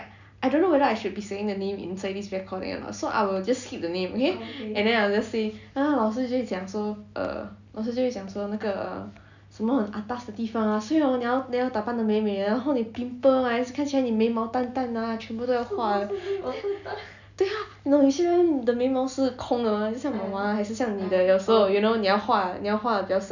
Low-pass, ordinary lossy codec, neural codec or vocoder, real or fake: 7.2 kHz; none; none; real